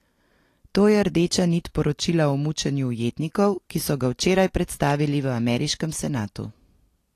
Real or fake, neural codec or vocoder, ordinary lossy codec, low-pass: real; none; AAC, 48 kbps; 14.4 kHz